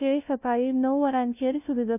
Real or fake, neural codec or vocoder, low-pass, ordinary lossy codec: fake; codec, 16 kHz, 0.5 kbps, FunCodec, trained on LibriTTS, 25 frames a second; 3.6 kHz; none